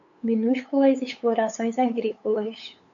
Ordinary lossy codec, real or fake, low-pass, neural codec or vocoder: AAC, 64 kbps; fake; 7.2 kHz; codec, 16 kHz, 8 kbps, FunCodec, trained on LibriTTS, 25 frames a second